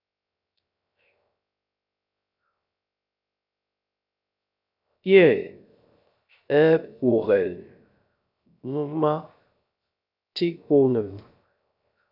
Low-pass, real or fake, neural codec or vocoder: 5.4 kHz; fake; codec, 16 kHz, 0.3 kbps, FocalCodec